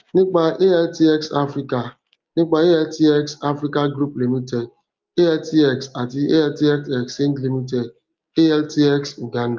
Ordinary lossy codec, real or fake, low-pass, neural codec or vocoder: Opus, 24 kbps; real; 7.2 kHz; none